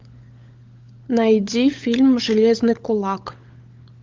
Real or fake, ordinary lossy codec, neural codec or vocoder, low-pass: fake; Opus, 32 kbps; codec, 16 kHz, 16 kbps, FunCodec, trained on LibriTTS, 50 frames a second; 7.2 kHz